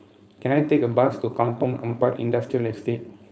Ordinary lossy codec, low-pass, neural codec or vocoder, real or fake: none; none; codec, 16 kHz, 4.8 kbps, FACodec; fake